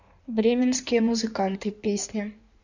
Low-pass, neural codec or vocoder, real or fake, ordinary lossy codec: 7.2 kHz; codec, 16 kHz in and 24 kHz out, 1.1 kbps, FireRedTTS-2 codec; fake; none